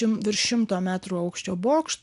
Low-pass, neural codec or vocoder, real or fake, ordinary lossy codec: 10.8 kHz; none; real; AAC, 96 kbps